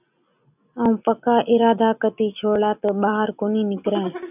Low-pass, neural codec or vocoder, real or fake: 3.6 kHz; none; real